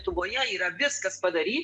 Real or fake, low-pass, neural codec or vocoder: real; 10.8 kHz; none